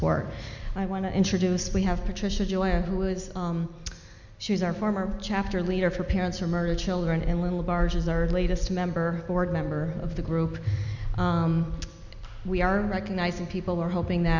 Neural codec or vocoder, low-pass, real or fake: none; 7.2 kHz; real